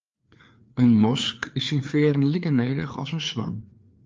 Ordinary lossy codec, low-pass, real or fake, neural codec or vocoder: Opus, 24 kbps; 7.2 kHz; fake; codec, 16 kHz, 4 kbps, FreqCodec, larger model